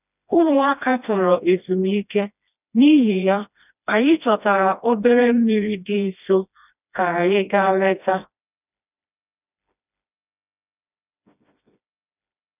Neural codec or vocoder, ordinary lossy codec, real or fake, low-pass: codec, 16 kHz, 1 kbps, FreqCodec, smaller model; none; fake; 3.6 kHz